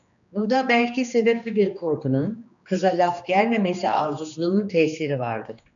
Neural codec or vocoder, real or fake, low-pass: codec, 16 kHz, 2 kbps, X-Codec, HuBERT features, trained on general audio; fake; 7.2 kHz